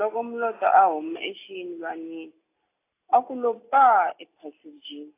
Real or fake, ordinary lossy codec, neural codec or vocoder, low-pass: real; AAC, 24 kbps; none; 3.6 kHz